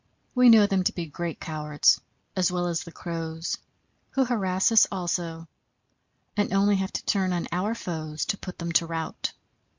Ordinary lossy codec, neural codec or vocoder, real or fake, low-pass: MP3, 48 kbps; none; real; 7.2 kHz